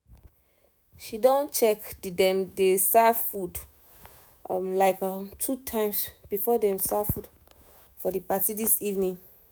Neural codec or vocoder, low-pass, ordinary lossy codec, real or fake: autoencoder, 48 kHz, 128 numbers a frame, DAC-VAE, trained on Japanese speech; none; none; fake